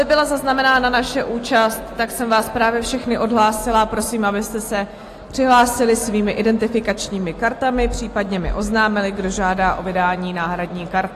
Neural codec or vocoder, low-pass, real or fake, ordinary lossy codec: none; 14.4 kHz; real; AAC, 48 kbps